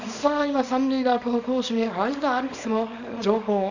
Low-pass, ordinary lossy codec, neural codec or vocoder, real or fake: 7.2 kHz; none; codec, 24 kHz, 0.9 kbps, WavTokenizer, small release; fake